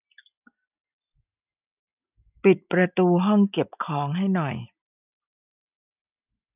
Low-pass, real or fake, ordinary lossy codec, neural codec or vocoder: 3.6 kHz; real; none; none